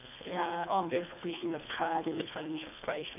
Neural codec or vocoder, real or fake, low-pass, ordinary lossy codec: codec, 24 kHz, 1.5 kbps, HILCodec; fake; 3.6 kHz; none